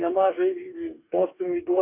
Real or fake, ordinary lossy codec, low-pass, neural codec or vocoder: fake; AAC, 24 kbps; 3.6 kHz; codec, 44.1 kHz, 2.6 kbps, DAC